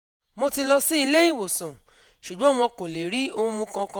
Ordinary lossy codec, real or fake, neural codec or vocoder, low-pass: none; fake; vocoder, 48 kHz, 128 mel bands, Vocos; none